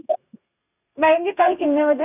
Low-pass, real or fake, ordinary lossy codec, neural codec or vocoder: 3.6 kHz; fake; none; codec, 32 kHz, 1.9 kbps, SNAC